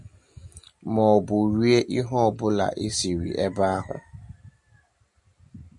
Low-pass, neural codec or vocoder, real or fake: 10.8 kHz; none; real